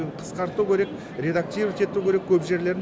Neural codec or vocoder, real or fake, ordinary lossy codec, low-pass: none; real; none; none